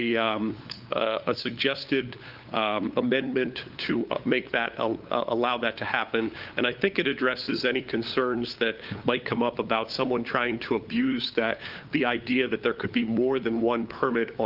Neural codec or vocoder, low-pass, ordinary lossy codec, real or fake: codec, 16 kHz, 8 kbps, FunCodec, trained on LibriTTS, 25 frames a second; 5.4 kHz; Opus, 32 kbps; fake